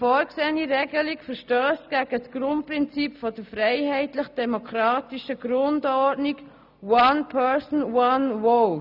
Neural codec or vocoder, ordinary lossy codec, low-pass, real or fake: none; none; 5.4 kHz; real